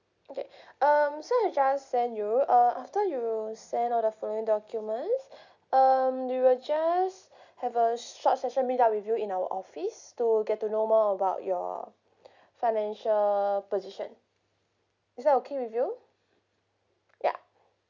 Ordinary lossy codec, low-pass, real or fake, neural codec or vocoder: none; 7.2 kHz; real; none